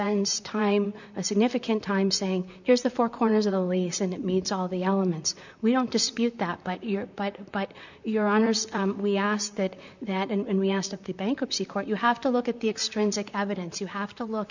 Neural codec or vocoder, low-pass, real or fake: vocoder, 44.1 kHz, 128 mel bands, Pupu-Vocoder; 7.2 kHz; fake